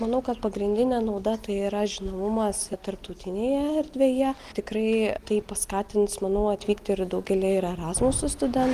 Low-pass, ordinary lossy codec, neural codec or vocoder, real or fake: 14.4 kHz; Opus, 24 kbps; none; real